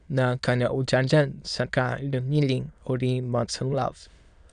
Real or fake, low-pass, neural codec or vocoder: fake; 9.9 kHz; autoencoder, 22.05 kHz, a latent of 192 numbers a frame, VITS, trained on many speakers